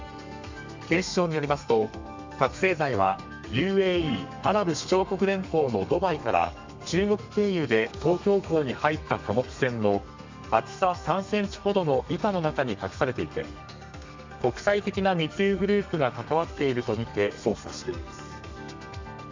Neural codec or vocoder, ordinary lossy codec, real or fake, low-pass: codec, 32 kHz, 1.9 kbps, SNAC; MP3, 64 kbps; fake; 7.2 kHz